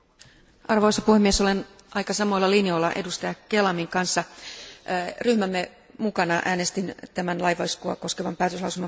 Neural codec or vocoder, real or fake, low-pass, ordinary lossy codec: none; real; none; none